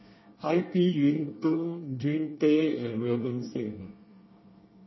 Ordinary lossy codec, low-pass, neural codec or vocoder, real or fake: MP3, 24 kbps; 7.2 kHz; codec, 24 kHz, 1 kbps, SNAC; fake